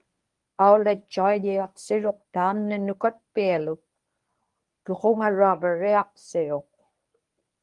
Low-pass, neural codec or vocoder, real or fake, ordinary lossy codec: 10.8 kHz; codec, 24 kHz, 0.9 kbps, WavTokenizer, medium speech release version 1; fake; Opus, 32 kbps